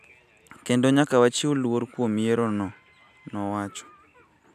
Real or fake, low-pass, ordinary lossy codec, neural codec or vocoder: real; 14.4 kHz; none; none